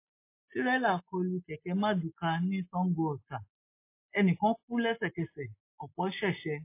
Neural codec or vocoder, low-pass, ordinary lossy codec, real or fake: none; 3.6 kHz; MP3, 24 kbps; real